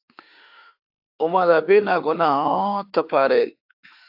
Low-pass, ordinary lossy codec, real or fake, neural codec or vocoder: 5.4 kHz; AAC, 48 kbps; fake; autoencoder, 48 kHz, 32 numbers a frame, DAC-VAE, trained on Japanese speech